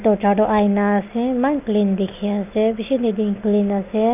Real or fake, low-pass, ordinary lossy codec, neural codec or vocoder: real; 3.6 kHz; none; none